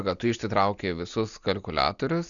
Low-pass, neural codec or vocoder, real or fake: 7.2 kHz; none; real